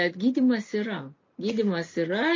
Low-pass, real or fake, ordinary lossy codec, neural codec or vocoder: 7.2 kHz; real; MP3, 32 kbps; none